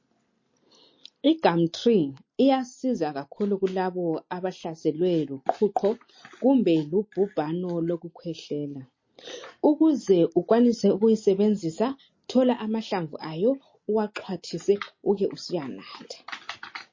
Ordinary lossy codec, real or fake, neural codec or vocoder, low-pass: MP3, 32 kbps; real; none; 7.2 kHz